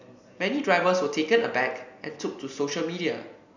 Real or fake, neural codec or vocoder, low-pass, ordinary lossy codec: real; none; 7.2 kHz; none